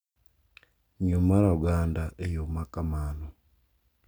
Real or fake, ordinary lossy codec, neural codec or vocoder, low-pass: real; none; none; none